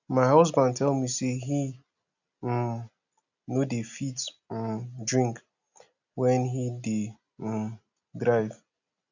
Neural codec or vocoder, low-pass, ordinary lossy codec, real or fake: none; 7.2 kHz; none; real